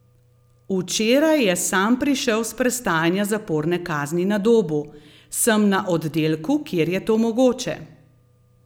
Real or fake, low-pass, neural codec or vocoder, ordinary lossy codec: real; none; none; none